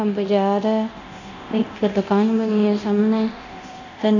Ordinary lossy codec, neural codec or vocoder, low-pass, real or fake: none; codec, 24 kHz, 0.9 kbps, DualCodec; 7.2 kHz; fake